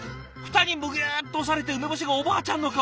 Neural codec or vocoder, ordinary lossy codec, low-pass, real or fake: none; none; none; real